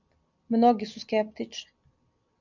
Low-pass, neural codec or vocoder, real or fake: 7.2 kHz; none; real